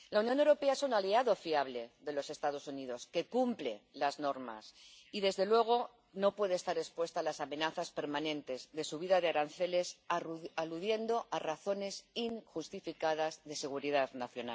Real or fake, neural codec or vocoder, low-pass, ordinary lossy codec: real; none; none; none